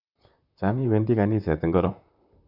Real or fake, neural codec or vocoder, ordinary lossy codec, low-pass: fake; vocoder, 44.1 kHz, 128 mel bands, Pupu-Vocoder; none; 5.4 kHz